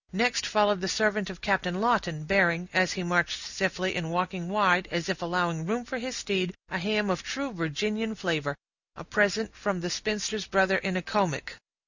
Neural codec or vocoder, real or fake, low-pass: none; real; 7.2 kHz